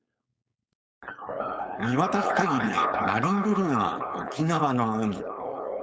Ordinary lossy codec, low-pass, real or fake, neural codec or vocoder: none; none; fake; codec, 16 kHz, 4.8 kbps, FACodec